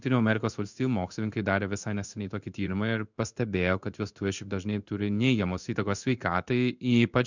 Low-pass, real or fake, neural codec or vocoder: 7.2 kHz; fake; codec, 16 kHz in and 24 kHz out, 1 kbps, XY-Tokenizer